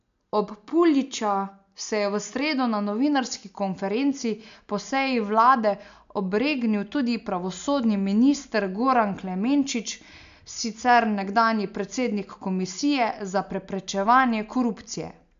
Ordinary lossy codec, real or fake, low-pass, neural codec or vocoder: MP3, 64 kbps; real; 7.2 kHz; none